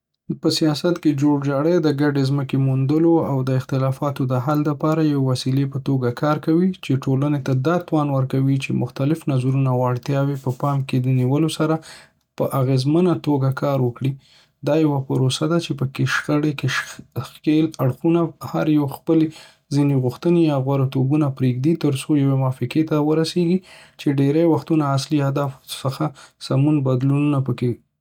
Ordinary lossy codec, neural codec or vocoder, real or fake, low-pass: none; none; real; 19.8 kHz